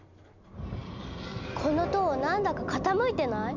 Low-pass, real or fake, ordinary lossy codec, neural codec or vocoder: 7.2 kHz; real; none; none